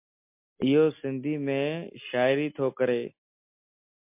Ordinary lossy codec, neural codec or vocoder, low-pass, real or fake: MP3, 32 kbps; none; 3.6 kHz; real